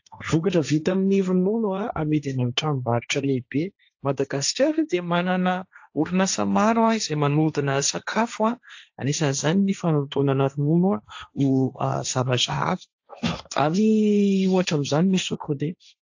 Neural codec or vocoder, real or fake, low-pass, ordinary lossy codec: codec, 16 kHz, 1.1 kbps, Voila-Tokenizer; fake; 7.2 kHz; AAC, 48 kbps